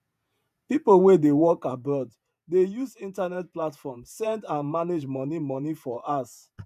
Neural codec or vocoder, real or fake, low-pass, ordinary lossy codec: vocoder, 48 kHz, 128 mel bands, Vocos; fake; 14.4 kHz; none